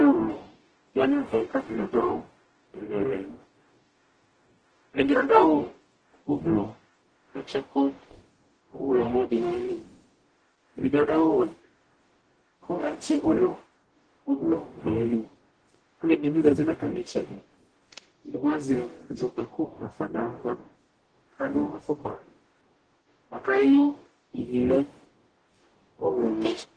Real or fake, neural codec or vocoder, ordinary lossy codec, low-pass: fake; codec, 44.1 kHz, 0.9 kbps, DAC; Opus, 16 kbps; 9.9 kHz